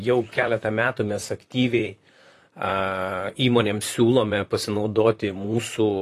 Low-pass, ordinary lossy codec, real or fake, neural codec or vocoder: 14.4 kHz; AAC, 48 kbps; fake; vocoder, 44.1 kHz, 128 mel bands, Pupu-Vocoder